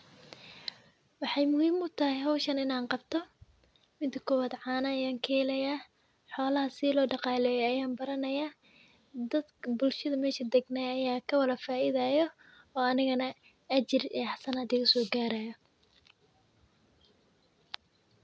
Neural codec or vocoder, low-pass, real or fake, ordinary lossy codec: none; none; real; none